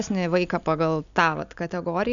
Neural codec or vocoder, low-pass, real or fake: none; 7.2 kHz; real